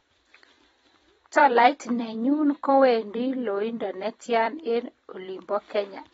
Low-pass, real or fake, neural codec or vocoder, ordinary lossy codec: 19.8 kHz; fake; vocoder, 44.1 kHz, 128 mel bands every 256 samples, BigVGAN v2; AAC, 24 kbps